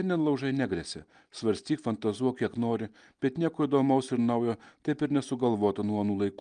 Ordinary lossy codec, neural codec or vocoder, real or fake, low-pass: Opus, 32 kbps; none; real; 9.9 kHz